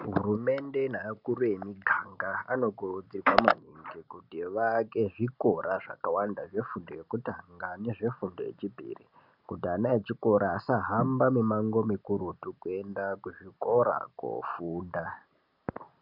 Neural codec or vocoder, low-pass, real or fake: none; 5.4 kHz; real